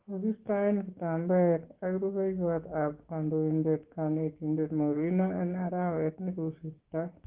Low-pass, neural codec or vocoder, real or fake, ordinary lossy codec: 3.6 kHz; codec, 16 kHz, 6 kbps, DAC; fake; Opus, 16 kbps